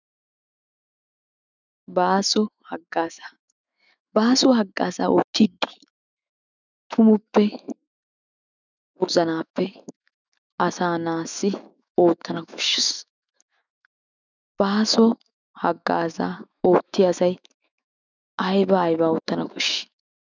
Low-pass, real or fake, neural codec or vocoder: 7.2 kHz; fake; autoencoder, 48 kHz, 128 numbers a frame, DAC-VAE, trained on Japanese speech